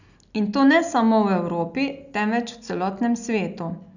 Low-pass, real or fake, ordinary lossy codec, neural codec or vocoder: 7.2 kHz; real; none; none